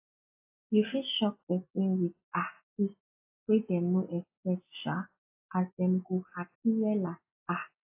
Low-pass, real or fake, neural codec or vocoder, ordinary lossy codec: 3.6 kHz; real; none; AAC, 24 kbps